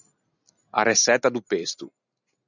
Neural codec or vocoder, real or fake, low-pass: none; real; 7.2 kHz